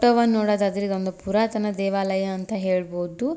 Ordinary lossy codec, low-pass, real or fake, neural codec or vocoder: none; none; real; none